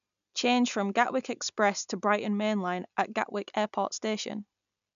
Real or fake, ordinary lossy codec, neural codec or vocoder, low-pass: real; none; none; 7.2 kHz